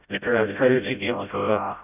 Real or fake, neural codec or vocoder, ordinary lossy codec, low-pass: fake; codec, 16 kHz, 0.5 kbps, FreqCodec, smaller model; none; 3.6 kHz